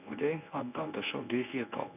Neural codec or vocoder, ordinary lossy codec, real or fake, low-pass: codec, 24 kHz, 0.9 kbps, WavTokenizer, medium speech release version 1; none; fake; 3.6 kHz